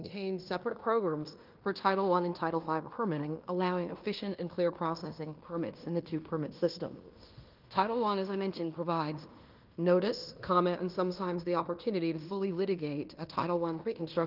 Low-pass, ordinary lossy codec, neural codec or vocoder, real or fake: 5.4 kHz; Opus, 32 kbps; codec, 16 kHz in and 24 kHz out, 0.9 kbps, LongCat-Audio-Codec, fine tuned four codebook decoder; fake